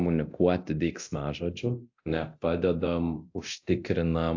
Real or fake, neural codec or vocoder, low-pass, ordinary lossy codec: fake; codec, 24 kHz, 0.9 kbps, DualCodec; 7.2 kHz; AAC, 48 kbps